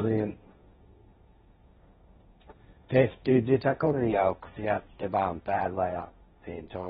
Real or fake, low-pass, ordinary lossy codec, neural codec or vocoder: fake; 7.2 kHz; AAC, 16 kbps; codec, 16 kHz, 1.1 kbps, Voila-Tokenizer